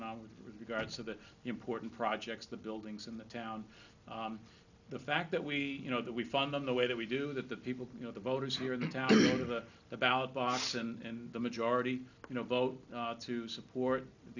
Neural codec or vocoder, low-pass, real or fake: none; 7.2 kHz; real